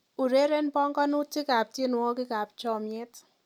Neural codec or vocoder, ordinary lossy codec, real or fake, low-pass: none; none; real; 19.8 kHz